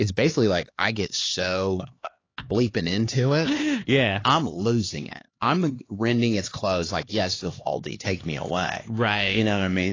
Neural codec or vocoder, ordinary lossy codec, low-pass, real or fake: codec, 16 kHz, 2 kbps, X-Codec, HuBERT features, trained on LibriSpeech; AAC, 32 kbps; 7.2 kHz; fake